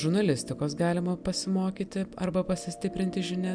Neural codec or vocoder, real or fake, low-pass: none; real; 9.9 kHz